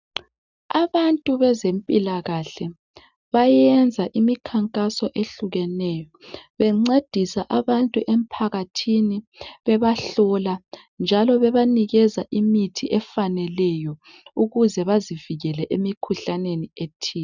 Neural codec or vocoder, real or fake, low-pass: none; real; 7.2 kHz